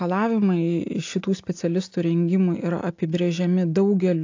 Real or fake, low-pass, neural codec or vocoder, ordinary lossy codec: real; 7.2 kHz; none; AAC, 48 kbps